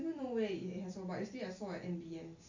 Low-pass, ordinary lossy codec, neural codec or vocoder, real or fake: 7.2 kHz; MP3, 64 kbps; none; real